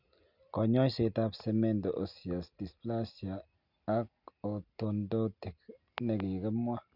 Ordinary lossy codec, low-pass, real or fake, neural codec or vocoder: none; 5.4 kHz; real; none